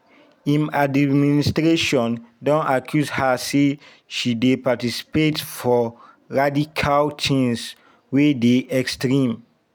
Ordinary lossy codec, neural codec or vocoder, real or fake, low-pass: none; none; real; none